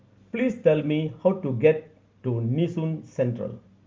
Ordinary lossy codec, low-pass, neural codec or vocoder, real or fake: Opus, 32 kbps; 7.2 kHz; none; real